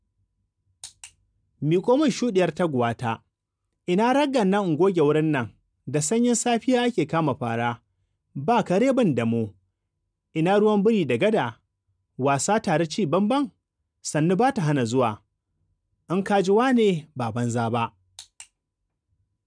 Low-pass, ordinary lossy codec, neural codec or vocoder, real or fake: 9.9 kHz; none; none; real